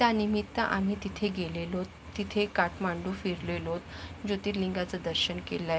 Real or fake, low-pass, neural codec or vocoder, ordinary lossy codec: real; none; none; none